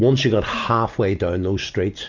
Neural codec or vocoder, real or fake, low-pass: none; real; 7.2 kHz